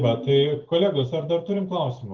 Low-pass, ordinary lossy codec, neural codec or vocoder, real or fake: 7.2 kHz; Opus, 32 kbps; none; real